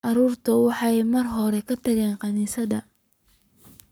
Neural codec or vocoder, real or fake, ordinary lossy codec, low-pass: codec, 44.1 kHz, 7.8 kbps, Pupu-Codec; fake; none; none